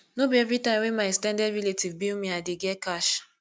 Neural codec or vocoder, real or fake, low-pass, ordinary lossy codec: none; real; none; none